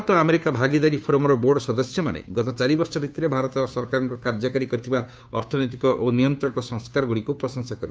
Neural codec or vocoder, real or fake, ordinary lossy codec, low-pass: codec, 16 kHz, 2 kbps, FunCodec, trained on Chinese and English, 25 frames a second; fake; none; none